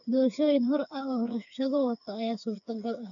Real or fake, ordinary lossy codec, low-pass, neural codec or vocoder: fake; none; 7.2 kHz; codec, 16 kHz, 4 kbps, FreqCodec, smaller model